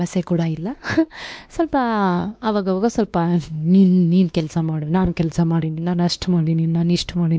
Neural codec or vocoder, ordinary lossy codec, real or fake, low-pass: codec, 16 kHz, 2 kbps, X-Codec, WavLM features, trained on Multilingual LibriSpeech; none; fake; none